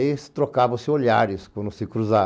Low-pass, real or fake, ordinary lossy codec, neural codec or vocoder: none; real; none; none